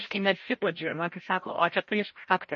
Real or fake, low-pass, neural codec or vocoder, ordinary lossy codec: fake; 7.2 kHz; codec, 16 kHz, 0.5 kbps, FreqCodec, larger model; MP3, 32 kbps